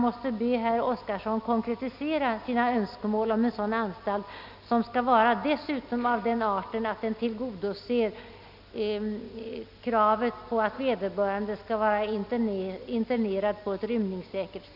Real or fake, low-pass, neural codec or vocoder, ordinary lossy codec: real; 5.4 kHz; none; none